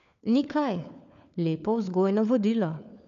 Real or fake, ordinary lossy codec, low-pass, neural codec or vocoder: fake; none; 7.2 kHz; codec, 16 kHz, 4 kbps, FunCodec, trained on LibriTTS, 50 frames a second